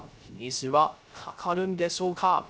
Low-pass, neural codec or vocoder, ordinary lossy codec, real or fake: none; codec, 16 kHz, 0.3 kbps, FocalCodec; none; fake